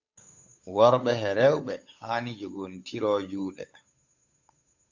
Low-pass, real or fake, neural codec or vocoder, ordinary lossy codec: 7.2 kHz; fake; codec, 16 kHz, 8 kbps, FunCodec, trained on Chinese and English, 25 frames a second; AAC, 48 kbps